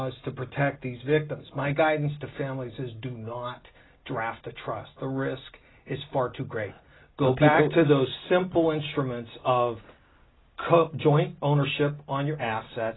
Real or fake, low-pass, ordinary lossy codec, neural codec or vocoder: real; 7.2 kHz; AAC, 16 kbps; none